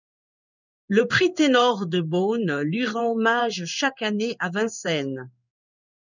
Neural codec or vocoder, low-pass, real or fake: codec, 16 kHz in and 24 kHz out, 1 kbps, XY-Tokenizer; 7.2 kHz; fake